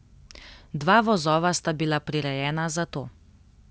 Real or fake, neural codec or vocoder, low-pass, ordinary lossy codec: real; none; none; none